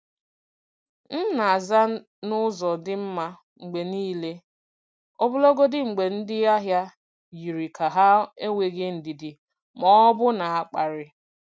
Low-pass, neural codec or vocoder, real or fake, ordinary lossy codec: none; none; real; none